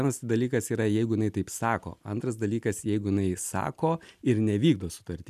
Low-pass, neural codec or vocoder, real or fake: 14.4 kHz; none; real